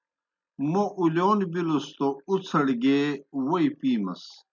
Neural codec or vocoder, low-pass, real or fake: none; 7.2 kHz; real